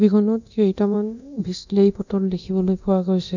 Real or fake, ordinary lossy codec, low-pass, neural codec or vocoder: fake; none; 7.2 kHz; codec, 24 kHz, 0.9 kbps, DualCodec